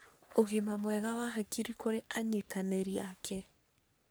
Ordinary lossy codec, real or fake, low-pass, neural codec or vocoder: none; fake; none; codec, 44.1 kHz, 3.4 kbps, Pupu-Codec